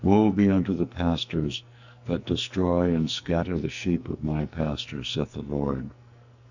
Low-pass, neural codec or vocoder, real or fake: 7.2 kHz; codec, 44.1 kHz, 2.6 kbps, SNAC; fake